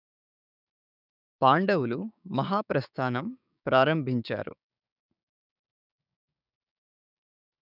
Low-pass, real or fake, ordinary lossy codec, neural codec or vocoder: 5.4 kHz; fake; none; codec, 16 kHz, 6 kbps, DAC